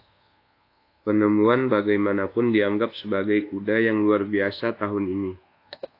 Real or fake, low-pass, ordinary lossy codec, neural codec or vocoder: fake; 5.4 kHz; AAC, 32 kbps; codec, 24 kHz, 1.2 kbps, DualCodec